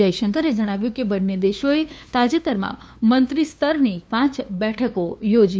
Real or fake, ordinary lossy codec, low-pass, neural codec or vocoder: fake; none; none; codec, 16 kHz, 2 kbps, FunCodec, trained on LibriTTS, 25 frames a second